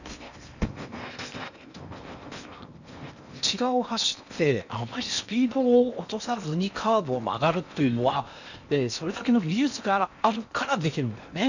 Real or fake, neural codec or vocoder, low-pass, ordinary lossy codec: fake; codec, 16 kHz in and 24 kHz out, 0.8 kbps, FocalCodec, streaming, 65536 codes; 7.2 kHz; none